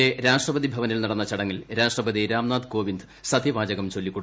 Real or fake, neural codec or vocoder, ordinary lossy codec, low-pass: real; none; none; none